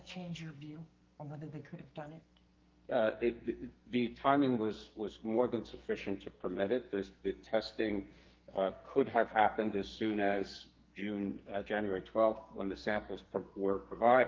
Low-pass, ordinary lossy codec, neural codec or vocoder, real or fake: 7.2 kHz; Opus, 32 kbps; codec, 44.1 kHz, 2.6 kbps, SNAC; fake